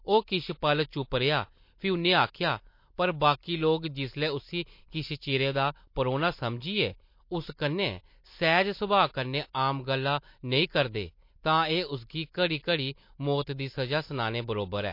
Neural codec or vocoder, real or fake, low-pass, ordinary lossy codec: none; real; 5.4 kHz; MP3, 32 kbps